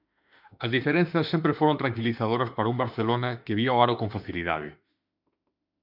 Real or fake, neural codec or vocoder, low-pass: fake; autoencoder, 48 kHz, 32 numbers a frame, DAC-VAE, trained on Japanese speech; 5.4 kHz